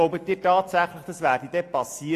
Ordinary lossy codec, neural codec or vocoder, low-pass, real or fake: AAC, 64 kbps; none; 14.4 kHz; real